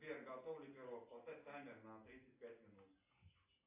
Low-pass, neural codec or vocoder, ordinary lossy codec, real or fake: 3.6 kHz; none; AAC, 32 kbps; real